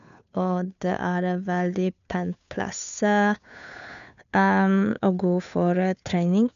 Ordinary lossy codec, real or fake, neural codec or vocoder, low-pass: none; fake; codec, 16 kHz, 2 kbps, FunCodec, trained on Chinese and English, 25 frames a second; 7.2 kHz